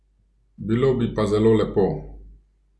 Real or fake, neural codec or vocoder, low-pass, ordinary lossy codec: real; none; none; none